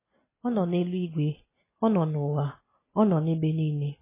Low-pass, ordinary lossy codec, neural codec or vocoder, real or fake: 3.6 kHz; MP3, 16 kbps; none; real